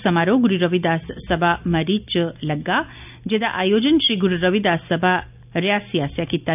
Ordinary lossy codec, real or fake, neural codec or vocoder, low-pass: none; real; none; 3.6 kHz